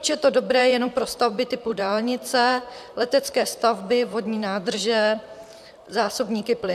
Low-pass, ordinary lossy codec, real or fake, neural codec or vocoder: 14.4 kHz; MP3, 96 kbps; fake; vocoder, 44.1 kHz, 128 mel bands, Pupu-Vocoder